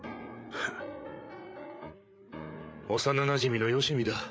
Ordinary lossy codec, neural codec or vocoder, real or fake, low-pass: none; codec, 16 kHz, 8 kbps, FreqCodec, larger model; fake; none